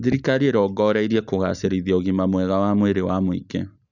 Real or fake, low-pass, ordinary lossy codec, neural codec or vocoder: real; 7.2 kHz; none; none